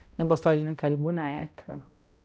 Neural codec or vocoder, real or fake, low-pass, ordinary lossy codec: codec, 16 kHz, 0.5 kbps, X-Codec, HuBERT features, trained on balanced general audio; fake; none; none